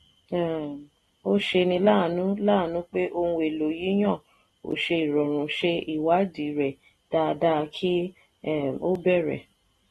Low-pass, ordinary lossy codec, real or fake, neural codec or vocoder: 19.8 kHz; AAC, 32 kbps; real; none